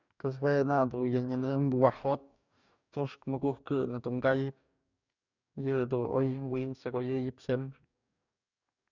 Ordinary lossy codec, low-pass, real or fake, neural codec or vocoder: none; 7.2 kHz; fake; codec, 44.1 kHz, 2.6 kbps, DAC